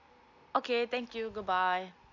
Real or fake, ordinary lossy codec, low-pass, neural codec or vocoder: real; none; 7.2 kHz; none